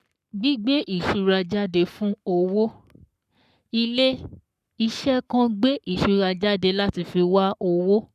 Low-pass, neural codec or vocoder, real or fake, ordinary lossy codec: 14.4 kHz; codec, 44.1 kHz, 7.8 kbps, Pupu-Codec; fake; none